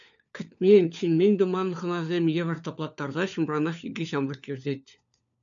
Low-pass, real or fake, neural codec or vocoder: 7.2 kHz; fake; codec, 16 kHz, 4 kbps, FunCodec, trained on LibriTTS, 50 frames a second